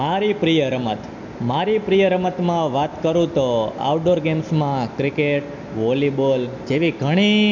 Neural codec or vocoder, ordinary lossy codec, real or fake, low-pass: none; MP3, 48 kbps; real; 7.2 kHz